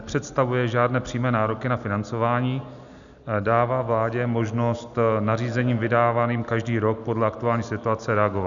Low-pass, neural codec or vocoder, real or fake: 7.2 kHz; none; real